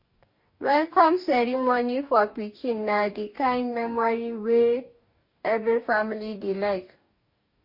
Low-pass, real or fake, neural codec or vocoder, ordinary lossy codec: 5.4 kHz; fake; codec, 44.1 kHz, 2.6 kbps, DAC; MP3, 32 kbps